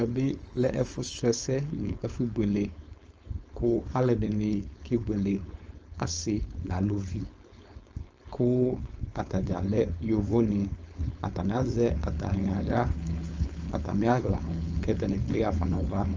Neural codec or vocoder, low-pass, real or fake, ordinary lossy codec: codec, 16 kHz, 4.8 kbps, FACodec; 7.2 kHz; fake; Opus, 24 kbps